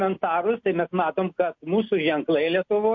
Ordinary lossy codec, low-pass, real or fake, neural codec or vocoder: MP3, 48 kbps; 7.2 kHz; real; none